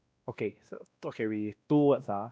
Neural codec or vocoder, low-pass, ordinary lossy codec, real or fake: codec, 16 kHz, 1 kbps, X-Codec, WavLM features, trained on Multilingual LibriSpeech; none; none; fake